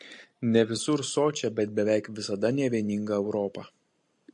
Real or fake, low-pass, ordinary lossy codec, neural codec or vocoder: real; 10.8 kHz; MP3, 48 kbps; none